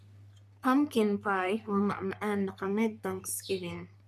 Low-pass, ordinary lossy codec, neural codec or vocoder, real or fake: 14.4 kHz; none; codec, 44.1 kHz, 3.4 kbps, Pupu-Codec; fake